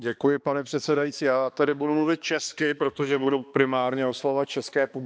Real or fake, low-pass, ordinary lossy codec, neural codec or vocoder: fake; none; none; codec, 16 kHz, 2 kbps, X-Codec, HuBERT features, trained on balanced general audio